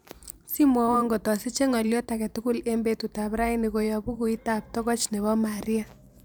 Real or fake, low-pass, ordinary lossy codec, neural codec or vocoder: fake; none; none; vocoder, 44.1 kHz, 128 mel bands every 512 samples, BigVGAN v2